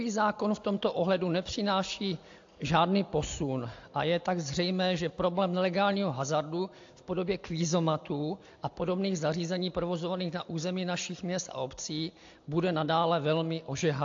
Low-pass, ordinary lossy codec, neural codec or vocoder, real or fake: 7.2 kHz; AAC, 48 kbps; none; real